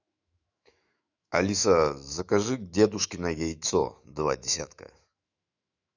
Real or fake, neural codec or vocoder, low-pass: fake; autoencoder, 48 kHz, 128 numbers a frame, DAC-VAE, trained on Japanese speech; 7.2 kHz